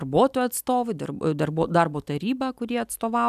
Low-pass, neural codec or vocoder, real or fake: 14.4 kHz; none; real